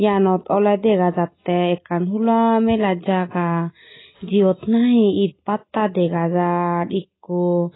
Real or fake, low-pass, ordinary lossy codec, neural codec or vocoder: real; 7.2 kHz; AAC, 16 kbps; none